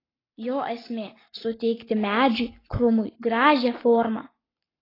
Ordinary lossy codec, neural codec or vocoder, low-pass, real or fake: AAC, 24 kbps; codec, 44.1 kHz, 7.8 kbps, Pupu-Codec; 5.4 kHz; fake